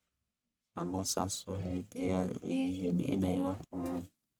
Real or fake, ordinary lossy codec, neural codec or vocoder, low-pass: fake; none; codec, 44.1 kHz, 1.7 kbps, Pupu-Codec; none